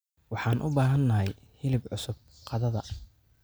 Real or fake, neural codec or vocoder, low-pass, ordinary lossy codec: real; none; none; none